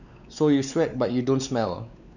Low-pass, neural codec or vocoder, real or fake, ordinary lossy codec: 7.2 kHz; codec, 16 kHz, 4 kbps, X-Codec, WavLM features, trained on Multilingual LibriSpeech; fake; none